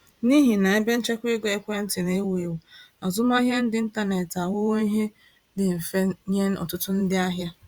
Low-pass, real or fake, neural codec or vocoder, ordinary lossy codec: 19.8 kHz; fake; vocoder, 44.1 kHz, 128 mel bands every 512 samples, BigVGAN v2; Opus, 64 kbps